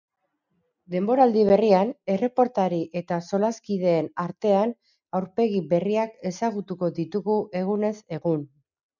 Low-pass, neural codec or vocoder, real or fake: 7.2 kHz; none; real